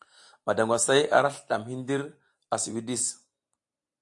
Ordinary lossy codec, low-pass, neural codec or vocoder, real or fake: AAC, 64 kbps; 10.8 kHz; none; real